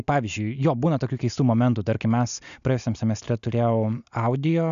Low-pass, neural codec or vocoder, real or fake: 7.2 kHz; none; real